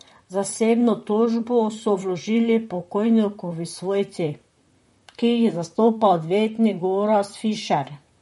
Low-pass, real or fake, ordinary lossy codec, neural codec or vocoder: 19.8 kHz; fake; MP3, 48 kbps; vocoder, 44.1 kHz, 128 mel bands, Pupu-Vocoder